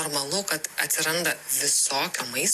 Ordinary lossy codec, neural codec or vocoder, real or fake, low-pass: AAC, 64 kbps; none; real; 14.4 kHz